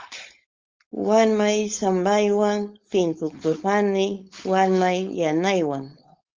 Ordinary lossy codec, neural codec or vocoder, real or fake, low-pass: Opus, 32 kbps; codec, 16 kHz, 4.8 kbps, FACodec; fake; 7.2 kHz